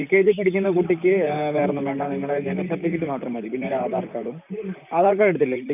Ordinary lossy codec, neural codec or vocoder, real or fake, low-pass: none; vocoder, 44.1 kHz, 128 mel bands, Pupu-Vocoder; fake; 3.6 kHz